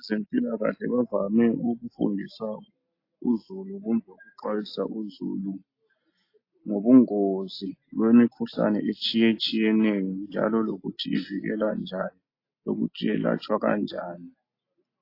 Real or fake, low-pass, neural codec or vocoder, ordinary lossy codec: real; 5.4 kHz; none; AAC, 32 kbps